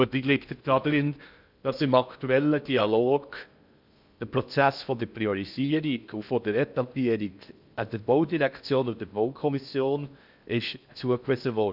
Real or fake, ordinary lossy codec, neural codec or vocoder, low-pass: fake; none; codec, 16 kHz in and 24 kHz out, 0.6 kbps, FocalCodec, streaming, 2048 codes; 5.4 kHz